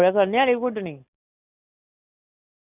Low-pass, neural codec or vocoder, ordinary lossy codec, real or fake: 3.6 kHz; none; none; real